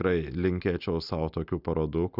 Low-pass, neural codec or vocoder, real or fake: 5.4 kHz; none; real